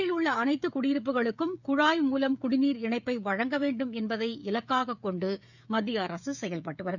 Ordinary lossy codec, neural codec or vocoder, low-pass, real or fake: none; codec, 16 kHz, 16 kbps, FreqCodec, smaller model; 7.2 kHz; fake